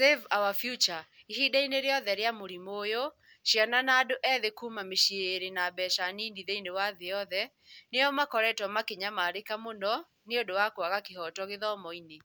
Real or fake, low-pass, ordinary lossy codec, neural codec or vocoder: real; none; none; none